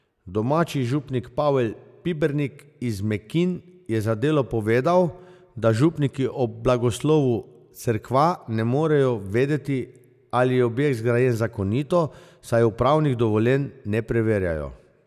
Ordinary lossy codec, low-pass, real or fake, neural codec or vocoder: none; 14.4 kHz; real; none